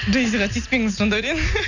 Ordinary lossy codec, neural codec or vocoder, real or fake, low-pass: none; none; real; 7.2 kHz